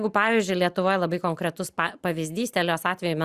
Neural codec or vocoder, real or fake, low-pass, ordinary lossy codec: none; real; 14.4 kHz; Opus, 64 kbps